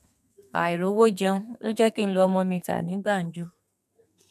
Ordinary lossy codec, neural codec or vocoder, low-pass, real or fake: none; codec, 32 kHz, 1.9 kbps, SNAC; 14.4 kHz; fake